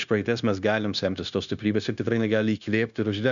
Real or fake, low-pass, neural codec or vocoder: fake; 7.2 kHz; codec, 16 kHz, 0.9 kbps, LongCat-Audio-Codec